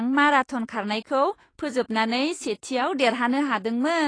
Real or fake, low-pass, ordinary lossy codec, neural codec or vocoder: fake; 9.9 kHz; AAC, 32 kbps; autoencoder, 48 kHz, 128 numbers a frame, DAC-VAE, trained on Japanese speech